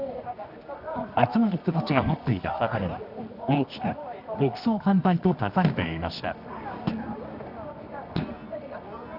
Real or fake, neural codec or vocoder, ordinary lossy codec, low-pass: fake; codec, 24 kHz, 0.9 kbps, WavTokenizer, medium music audio release; none; 5.4 kHz